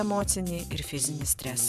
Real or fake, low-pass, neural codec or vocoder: fake; 14.4 kHz; vocoder, 44.1 kHz, 128 mel bands every 512 samples, BigVGAN v2